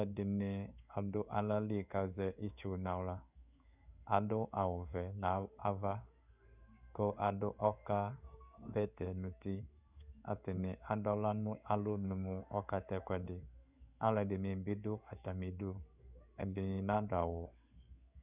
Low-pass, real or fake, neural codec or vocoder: 3.6 kHz; fake; codec, 16 kHz in and 24 kHz out, 1 kbps, XY-Tokenizer